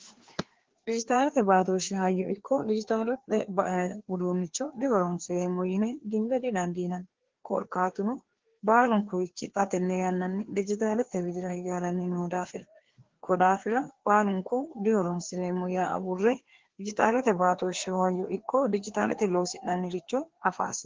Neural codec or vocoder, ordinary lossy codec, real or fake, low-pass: codec, 16 kHz, 2 kbps, FreqCodec, larger model; Opus, 16 kbps; fake; 7.2 kHz